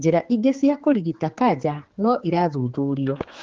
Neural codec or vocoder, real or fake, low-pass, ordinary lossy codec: codec, 16 kHz, 4 kbps, X-Codec, HuBERT features, trained on general audio; fake; 7.2 kHz; Opus, 16 kbps